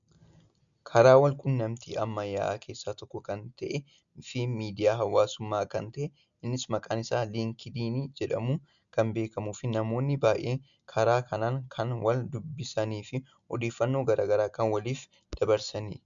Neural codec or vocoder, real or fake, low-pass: none; real; 7.2 kHz